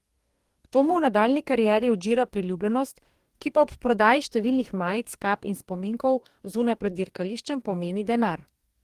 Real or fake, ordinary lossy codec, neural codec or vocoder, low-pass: fake; Opus, 24 kbps; codec, 44.1 kHz, 2.6 kbps, DAC; 14.4 kHz